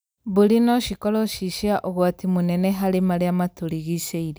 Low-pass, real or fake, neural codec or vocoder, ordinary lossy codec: none; real; none; none